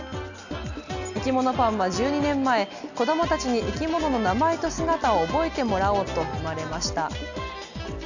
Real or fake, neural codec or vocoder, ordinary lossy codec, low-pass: real; none; none; 7.2 kHz